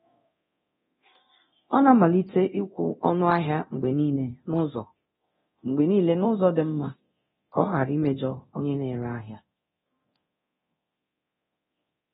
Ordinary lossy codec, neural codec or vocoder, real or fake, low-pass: AAC, 16 kbps; codec, 24 kHz, 0.9 kbps, DualCodec; fake; 10.8 kHz